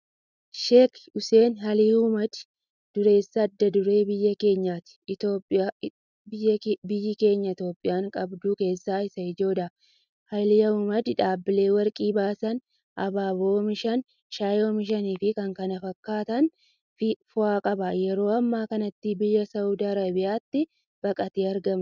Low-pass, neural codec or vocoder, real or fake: 7.2 kHz; none; real